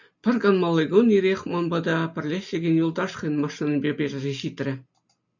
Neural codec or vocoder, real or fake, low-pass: none; real; 7.2 kHz